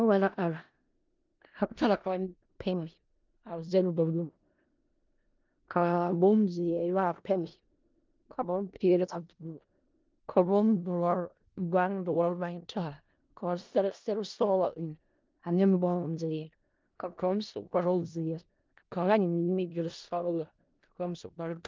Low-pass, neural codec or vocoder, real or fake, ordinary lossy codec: 7.2 kHz; codec, 16 kHz in and 24 kHz out, 0.4 kbps, LongCat-Audio-Codec, four codebook decoder; fake; Opus, 32 kbps